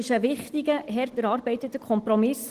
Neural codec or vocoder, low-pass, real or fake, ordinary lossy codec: none; 14.4 kHz; real; Opus, 16 kbps